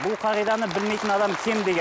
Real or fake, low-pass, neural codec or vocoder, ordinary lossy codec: real; none; none; none